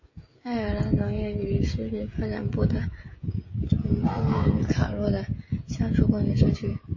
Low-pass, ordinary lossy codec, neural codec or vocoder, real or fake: 7.2 kHz; MP3, 32 kbps; codec, 16 kHz, 16 kbps, FreqCodec, smaller model; fake